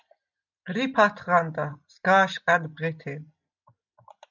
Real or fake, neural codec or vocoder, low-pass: real; none; 7.2 kHz